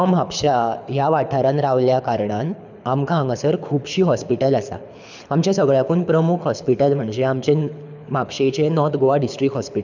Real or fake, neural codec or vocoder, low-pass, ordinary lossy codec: fake; codec, 24 kHz, 6 kbps, HILCodec; 7.2 kHz; none